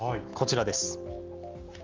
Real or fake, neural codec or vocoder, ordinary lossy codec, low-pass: real; none; Opus, 32 kbps; 7.2 kHz